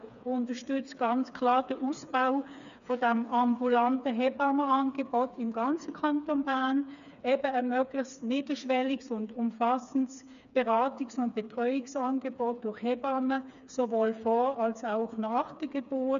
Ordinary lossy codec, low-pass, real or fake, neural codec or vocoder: none; 7.2 kHz; fake; codec, 16 kHz, 4 kbps, FreqCodec, smaller model